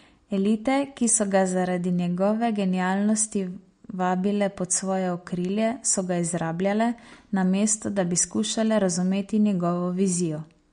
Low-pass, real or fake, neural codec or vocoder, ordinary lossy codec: 9.9 kHz; real; none; MP3, 48 kbps